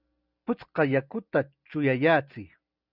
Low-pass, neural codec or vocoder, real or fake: 5.4 kHz; none; real